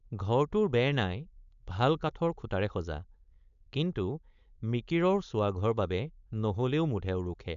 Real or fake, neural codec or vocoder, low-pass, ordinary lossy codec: fake; codec, 16 kHz, 4.8 kbps, FACodec; 7.2 kHz; none